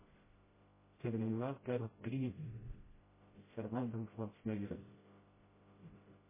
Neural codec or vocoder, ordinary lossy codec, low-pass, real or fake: codec, 16 kHz, 0.5 kbps, FreqCodec, smaller model; MP3, 16 kbps; 3.6 kHz; fake